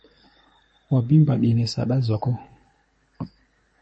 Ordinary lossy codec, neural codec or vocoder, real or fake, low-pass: MP3, 32 kbps; codec, 24 kHz, 6 kbps, HILCodec; fake; 9.9 kHz